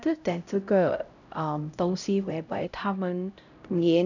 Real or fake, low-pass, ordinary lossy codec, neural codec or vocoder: fake; 7.2 kHz; none; codec, 16 kHz, 0.5 kbps, X-Codec, HuBERT features, trained on LibriSpeech